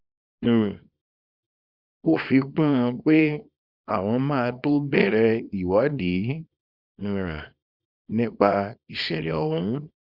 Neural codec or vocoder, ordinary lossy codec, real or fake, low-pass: codec, 24 kHz, 0.9 kbps, WavTokenizer, small release; Opus, 64 kbps; fake; 5.4 kHz